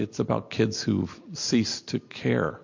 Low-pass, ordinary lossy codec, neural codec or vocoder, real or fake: 7.2 kHz; MP3, 48 kbps; none; real